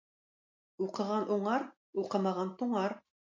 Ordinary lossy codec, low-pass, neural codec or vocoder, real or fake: MP3, 48 kbps; 7.2 kHz; none; real